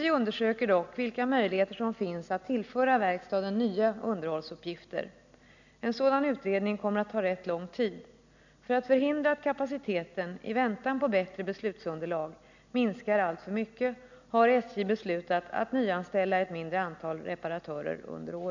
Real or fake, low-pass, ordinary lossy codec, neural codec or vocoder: real; 7.2 kHz; none; none